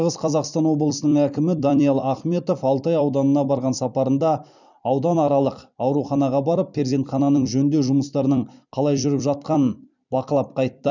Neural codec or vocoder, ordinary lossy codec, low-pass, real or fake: vocoder, 44.1 kHz, 128 mel bands every 256 samples, BigVGAN v2; none; 7.2 kHz; fake